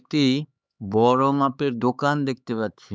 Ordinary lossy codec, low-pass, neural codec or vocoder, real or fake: none; none; codec, 16 kHz, 4 kbps, X-Codec, HuBERT features, trained on LibriSpeech; fake